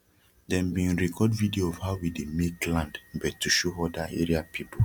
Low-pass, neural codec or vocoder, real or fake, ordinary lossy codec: 19.8 kHz; none; real; none